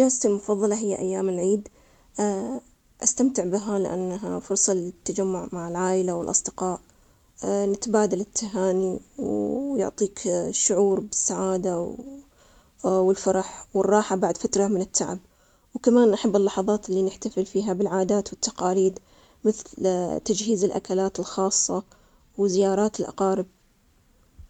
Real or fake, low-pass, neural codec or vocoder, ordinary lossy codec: real; 19.8 kHz; none; none